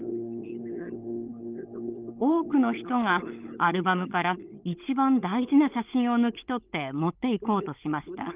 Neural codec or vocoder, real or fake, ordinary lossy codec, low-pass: codec, 16 kHz, 16 kbps, FunCodec, trained on LibriTTS, 50 frames a second; fake; Opus, 24 kbps; 3.6 kHz